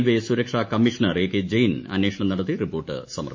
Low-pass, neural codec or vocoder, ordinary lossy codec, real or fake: 7.2 kHz; autoencoder, 48 kHz, 128 numbers a frame, DAC-VAE, trained on Japanese speech; MP3, 32 kbps; fake